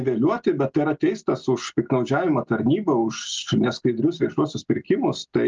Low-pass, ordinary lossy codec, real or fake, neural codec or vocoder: 7.2 kHz; Opus, 24 kbps; real; none